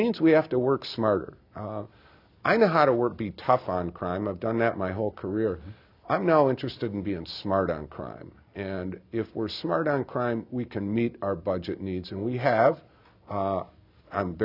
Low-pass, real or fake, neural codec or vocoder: 5.4 kHz; real; none